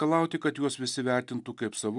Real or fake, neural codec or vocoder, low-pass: real; none; 10.8 kHz